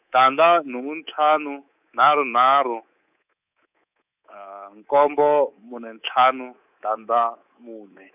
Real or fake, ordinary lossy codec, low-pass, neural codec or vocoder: fake; none; 3.6 kHz; codec, 24 kHz, 3.1 kbps, DualCodec